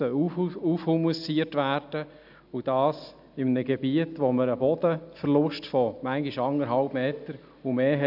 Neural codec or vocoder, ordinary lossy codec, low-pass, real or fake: none; AAC, 48 kbps; 5.4 kHz; real